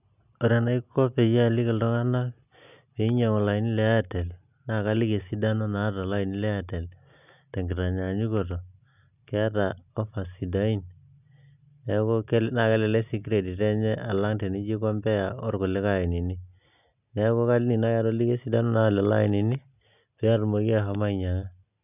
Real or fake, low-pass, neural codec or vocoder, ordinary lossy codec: real; 3.6 kHz; none; none